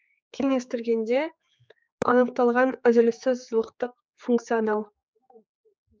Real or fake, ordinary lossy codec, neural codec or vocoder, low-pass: fake; none; codec, 16 kHz, 4 kbps, X-Codec, HuBERT features, trained on general audio; none